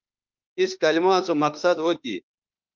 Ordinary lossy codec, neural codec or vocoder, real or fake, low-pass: Opus, 24 kbps; autoencoder, 48 kHz, 32 numbers a frame, DAC-VAE, trained on Japanese speech; fake; 7.2 kHz